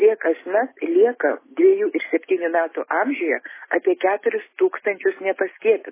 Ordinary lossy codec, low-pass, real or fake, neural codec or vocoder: MP3, 16 kbps; 3.6 kHz; real; none